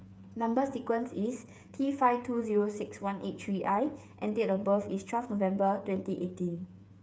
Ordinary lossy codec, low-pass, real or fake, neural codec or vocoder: none; none; fake; codec, 16 kHz, 8 kbps, FreqCodec, smaller model